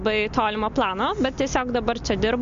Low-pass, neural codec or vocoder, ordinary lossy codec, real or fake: 7.2 kHz; none; MP3, 48 kbps; real